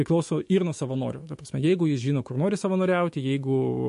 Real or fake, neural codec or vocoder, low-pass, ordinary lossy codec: fake; autoencoder, 48 kHz, 128 numbers a frame, DAC-VAE, trained on Japanese speech; 14.4 kHz; MP3, 48 kbps